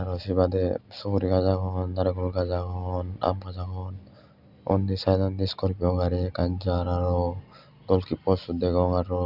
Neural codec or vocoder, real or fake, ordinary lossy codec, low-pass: none; real; none; 5.4 kHz